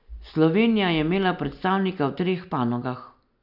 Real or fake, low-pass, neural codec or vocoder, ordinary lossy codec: real; 5.4 kHz; none; none